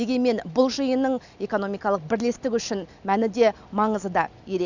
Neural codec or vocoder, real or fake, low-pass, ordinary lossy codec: none; real; 7.2 kHz; none